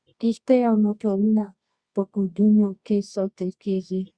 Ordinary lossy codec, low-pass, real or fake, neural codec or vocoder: Opus, 64 kbps; 9.9 kHz; fake; codec, 24 kHz, 0.9 kbps, WavTokenizer, medium music audio release